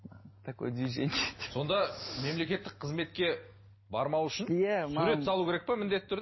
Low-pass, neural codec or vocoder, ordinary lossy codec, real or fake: 7.2 kHz; none; MP3, 24 kbps; real